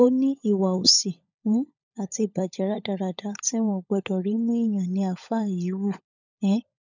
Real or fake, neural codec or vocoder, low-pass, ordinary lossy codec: fake; codec, 16 kHz, 16 kbps, FunCodec, trained on LibriTTS, 50 frames a second; 7.2 kHz; none